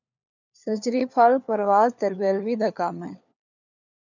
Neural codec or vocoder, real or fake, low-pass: codec, 16 kHz, 4 kbps, FunCodec, trained on LibriTTS, 50 frames a second; fake; 7.2 kHz